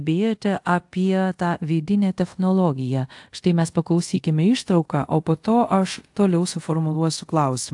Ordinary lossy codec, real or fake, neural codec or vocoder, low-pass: AAC, 64 kbps; fake; codec, 24 kHz, 0.5 kbps, DualCodec; 10.8 kHz